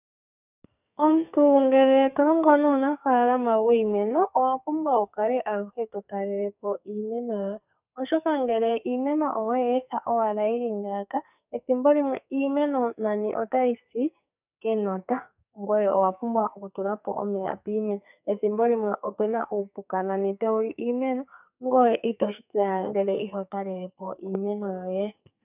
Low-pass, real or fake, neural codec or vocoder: 3.6 kHz; fake; codec, 44.1 kHz, 2.6 kbps, SNAC